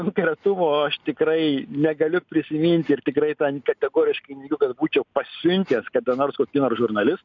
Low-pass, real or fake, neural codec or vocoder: 7.2 kHz; real; none